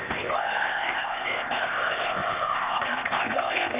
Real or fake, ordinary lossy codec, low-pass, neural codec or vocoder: fake; Opus, 64 kbps; 3.6 kHz; codec, 16 kHz, 0.8 kbps, ZipCodec